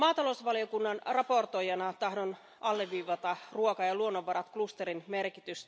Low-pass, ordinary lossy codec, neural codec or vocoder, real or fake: none; none; none; real